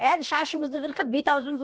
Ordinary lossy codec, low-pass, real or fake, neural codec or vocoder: none; none; fake; codec, 16 kHz, about 1 kbps, DyCAST, with the encoder's durations